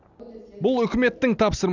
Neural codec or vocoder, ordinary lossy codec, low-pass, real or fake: none; none; 7.2 kHz; real